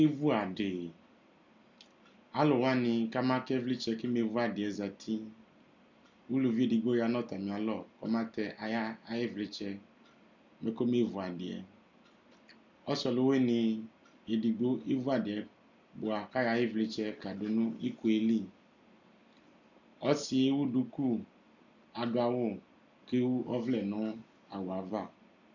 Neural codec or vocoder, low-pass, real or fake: none; 7.2 kHz; real